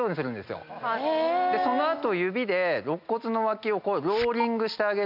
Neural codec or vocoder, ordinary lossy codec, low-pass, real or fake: none; none; 5.4 kHz; real